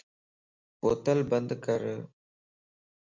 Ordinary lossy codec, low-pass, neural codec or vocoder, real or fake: MP3, 64 kbps; 7.2 kHz; none; real